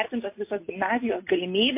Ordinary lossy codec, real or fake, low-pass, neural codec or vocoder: MP3, 24 kbps; real; 3.6 kHz; none